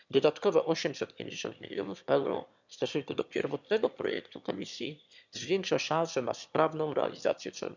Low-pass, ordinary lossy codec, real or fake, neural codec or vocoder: 7.2 kHz; none; fake; autoencoder, 22.05 kHz, a latent of 192 numbers a frame, VITS, trained on one speaker